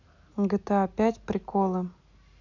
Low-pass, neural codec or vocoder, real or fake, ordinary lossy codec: 7.2 kHz; none; real; none